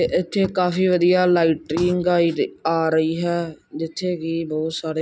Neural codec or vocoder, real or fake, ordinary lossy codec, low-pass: none; real; none; none